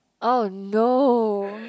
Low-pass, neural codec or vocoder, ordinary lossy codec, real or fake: none; none; none; real